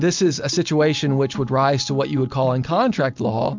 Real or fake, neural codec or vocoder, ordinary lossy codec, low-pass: real; none; MP3, 64 kbps; 7.2 kHz